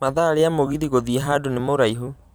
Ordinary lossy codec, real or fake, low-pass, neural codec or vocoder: none; fake; none; vocoder, 44.1 kHz, 128 mel bands every 256 samples, BigVGAN v2